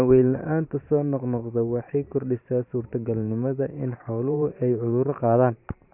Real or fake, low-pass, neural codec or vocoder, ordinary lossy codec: fake; 3.6 kHz; vocoder, 44.1 kHz, 128 mel bands every 512 samples, BigVGAN v2; none